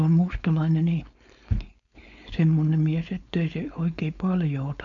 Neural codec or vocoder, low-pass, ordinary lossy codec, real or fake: codec, 16 kHz, 4.8 kbps, FACodec; 7.2 kHz; none; fake